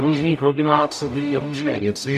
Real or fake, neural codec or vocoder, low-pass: fake; codec, 44.1 kHz, 0.9 kbps, DAC; 14.4 kHz